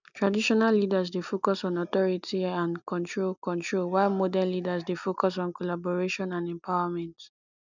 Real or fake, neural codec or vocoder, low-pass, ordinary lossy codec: real; none; 7.2 kHz; none